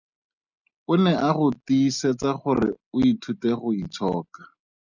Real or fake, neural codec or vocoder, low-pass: real; none; 7.2 kHz